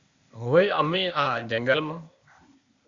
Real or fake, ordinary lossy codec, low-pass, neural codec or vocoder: fake; Opus, 64 kbps; 7.2 kHz; codec, 16 kHz, 0.8 kbps, ZipCodec